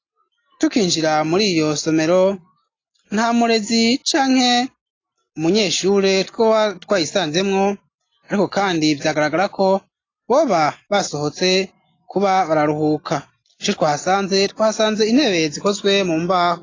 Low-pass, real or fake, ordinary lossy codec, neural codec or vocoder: 7.2 kHz; real; AAC, 32 kbps; none